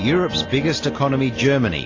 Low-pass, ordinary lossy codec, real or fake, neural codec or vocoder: 7.2 kHz; AAC, 32 kbps; real; none